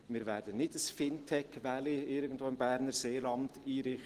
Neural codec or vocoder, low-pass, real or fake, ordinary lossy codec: none; 14.4 kHz; real; Opus, 16 kbps